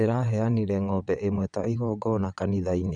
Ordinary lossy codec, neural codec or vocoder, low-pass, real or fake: none; vocoder, 22.05 kHz, 80 mel bands, WaveNeXt; 9.9 kHz; fake